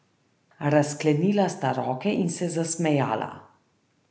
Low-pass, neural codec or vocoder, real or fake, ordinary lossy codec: none; none; real; none